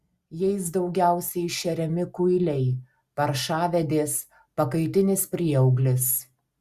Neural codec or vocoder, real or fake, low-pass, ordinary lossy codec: none; real; 14.4 kHz; Opus, 64 kbps